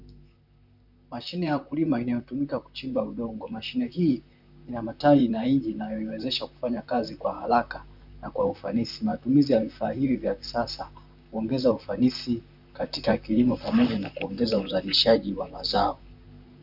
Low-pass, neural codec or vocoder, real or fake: 5.4 kHz; vocoder, 44.1 kHz, 128 mel bands, Pupu-Vocoder; fake